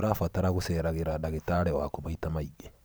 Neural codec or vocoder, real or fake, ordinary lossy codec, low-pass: vocoder, 44.1 kHz, 128 mel bands every 512 samples, BigVGAN v2; fake; none; none